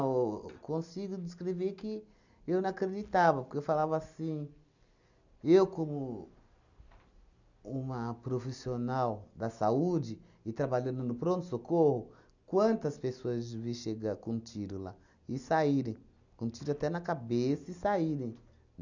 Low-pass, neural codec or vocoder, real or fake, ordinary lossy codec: 7.2 kHz; none; real; none